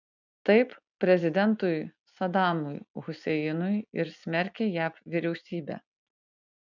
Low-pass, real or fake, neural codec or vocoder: 7.2 kHz; real; none